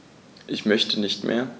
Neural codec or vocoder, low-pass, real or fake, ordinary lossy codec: none; none; real; none